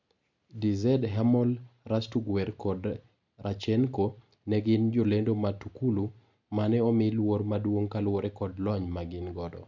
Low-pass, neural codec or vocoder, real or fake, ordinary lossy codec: 7.2 kHz; none; real; none